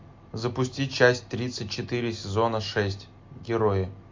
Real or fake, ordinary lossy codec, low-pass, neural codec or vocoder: real; MP3, 48 kbps; 7.2 kHz; none